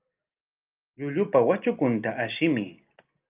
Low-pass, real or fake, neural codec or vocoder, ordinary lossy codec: 3.6 kHz; real; none; Opus, 24 kbps